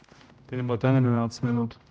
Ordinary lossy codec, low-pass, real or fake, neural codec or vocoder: none; none; fake; codec, 16 kHz, 0.5 kbps, X-Codec, HuBERT features, trained on general audio